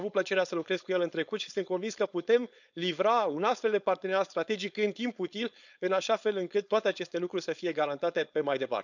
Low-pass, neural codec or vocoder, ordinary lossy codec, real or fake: 7.2 kHz; codec, 16 kHz, 4.8 kbps, FACodec; none; fake